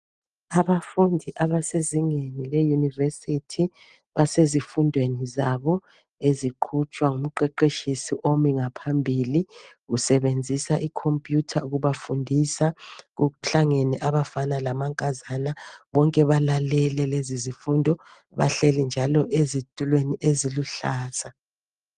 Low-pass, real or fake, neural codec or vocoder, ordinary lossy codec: 9.9 kHz; real; none; Opus, 24 kbps